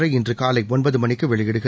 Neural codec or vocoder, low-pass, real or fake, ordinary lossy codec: none; none; real; none